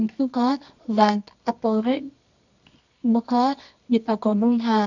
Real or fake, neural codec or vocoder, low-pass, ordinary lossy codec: fake; codec, 24 kHz, 0.9 kbps, WavTokenizer, medium music audio release; 7.2 kHz; none